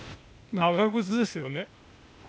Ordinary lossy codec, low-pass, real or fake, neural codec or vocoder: none; none; fake; codec, 16 kHz, 0.8 kbps, ZipCodec